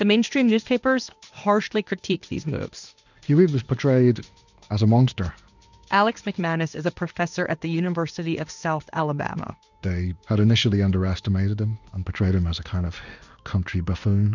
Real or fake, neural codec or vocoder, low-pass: fake; codec, 16 kHz in and 24 kHz out, 1 kbps, XY-Tokenizer; 7.2 kHz